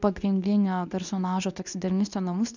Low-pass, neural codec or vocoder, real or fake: 7.2 kHz; codec, 24 kHz, 0.9 kbps, WavTokenizer, medium speech release version 2; fake